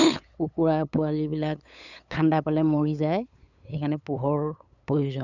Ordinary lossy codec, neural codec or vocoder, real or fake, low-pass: none; codec, 16 kHz, 8 kbps, FunCodec, trained on LibriTTS, 25 frames a second; fake; 7.2 kHz